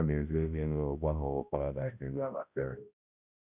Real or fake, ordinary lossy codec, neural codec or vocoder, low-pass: fake; Opus, 64 kbps; codec, 16 kHz, 0.5 kbps, X-Codec, HuBERT features, trained on balanced general audio; 3.6 kHz